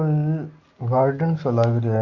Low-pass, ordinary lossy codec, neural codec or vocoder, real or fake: 7.2 kHz; Opus, 64 kbps; none; real